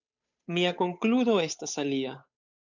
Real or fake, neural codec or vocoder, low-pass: fake; codec, 16 kHz, 8 kbps, FunCodec, trained on Chinese and English, 25 frames a second; 7.2 kHz